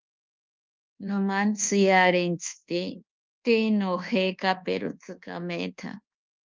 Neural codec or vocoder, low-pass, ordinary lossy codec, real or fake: codec, 24 kHz, 1.2 kbps, DualCodec; 7.2 kHz; Opus, 32 kbps; fake